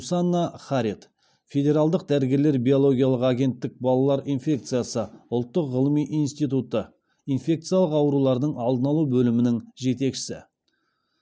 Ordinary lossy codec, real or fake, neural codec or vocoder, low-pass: none; real; none; none